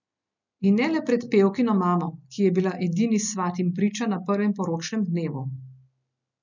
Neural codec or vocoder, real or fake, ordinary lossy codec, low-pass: none; real; none; 7.2 kHz